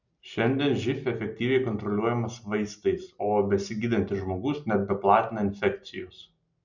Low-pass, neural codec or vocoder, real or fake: 7.2 kHz; none; real